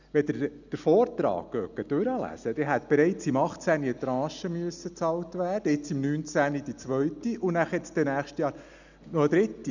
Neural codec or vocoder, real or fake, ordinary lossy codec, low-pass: none; real; none; 7.2 kHz